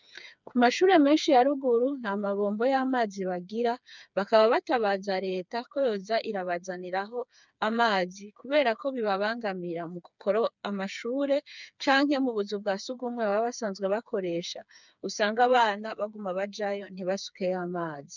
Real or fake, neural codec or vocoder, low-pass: fake; codec, 16 kHz, 4 kbps, FreqCodec, smaller model; 7.2 kHz